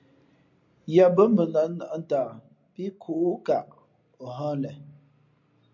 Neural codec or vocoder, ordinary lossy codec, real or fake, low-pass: none; MP3, 48 kbps; real; 7.2 kHz